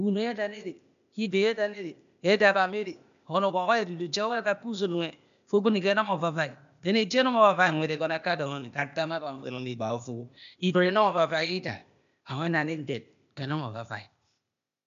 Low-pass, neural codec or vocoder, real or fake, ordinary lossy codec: 7.2 kHz; codec, 16 kHz, 0.8 kbps, ZipCodec; fake; none